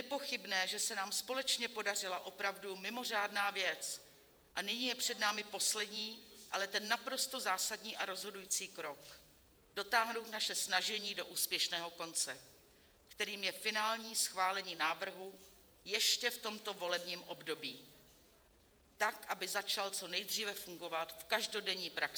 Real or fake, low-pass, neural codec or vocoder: fake; 19.8 kHz; vocoder, 48 kHz, 128 mel bands, Vocos